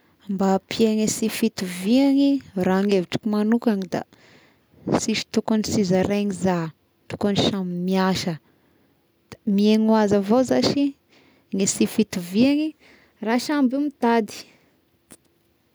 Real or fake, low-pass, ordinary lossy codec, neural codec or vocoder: real; none; none; none